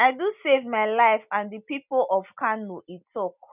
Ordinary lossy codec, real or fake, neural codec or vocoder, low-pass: none; real; none; 3.6 kHz